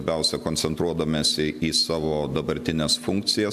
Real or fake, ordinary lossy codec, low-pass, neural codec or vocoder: real; MP3, 96 kbps; 14.4 kHz; none